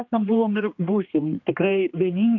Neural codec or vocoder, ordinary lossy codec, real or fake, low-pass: codec, 16 kHz, 2 kbps, X-Codec, HuBERT features, trained on general audio; AAC, 48 kbps; fake; 7.2 kHz